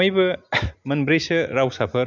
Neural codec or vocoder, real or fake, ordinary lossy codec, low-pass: none; real; none; none